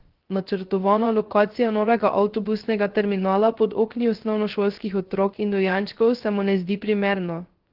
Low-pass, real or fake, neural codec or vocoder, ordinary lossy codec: 5.4 kHz; fake; codec, 16 kHz, 0.3 kbps, FocalCodec; Opus, 16 kbps